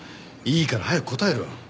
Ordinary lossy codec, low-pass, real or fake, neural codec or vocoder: none; none; real; none